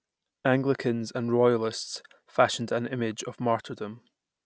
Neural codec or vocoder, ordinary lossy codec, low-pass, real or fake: none; none; none; real